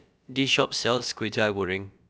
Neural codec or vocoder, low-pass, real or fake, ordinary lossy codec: codec, 16 kHz, about 1 kbps, DyCAST, with the encoder's durations; none; fake; none